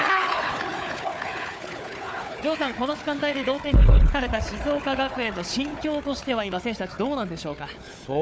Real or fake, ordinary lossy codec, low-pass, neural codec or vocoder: fake; none; none; codec, 16 kHz, 4 kbps, FunCodec, trained on Chinese and English, 50 frames a second